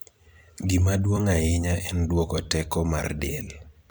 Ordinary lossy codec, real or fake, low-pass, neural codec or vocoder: none; fake; none; vocoder, 44.1 kHz, 128 mel bands every 256 samples, BigVGAN v2